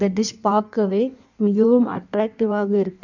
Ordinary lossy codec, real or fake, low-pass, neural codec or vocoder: none; fake; 7.2 kHz; codec, 16 kHz in and 24 kHz out, 1.1 kbps, FireRedTTS-2 codec